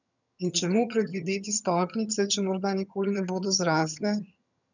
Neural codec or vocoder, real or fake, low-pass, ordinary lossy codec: vocoder, 22.05 kHz, 80 mel bands, HiFi-GAN; fake; 7.2 kHz; none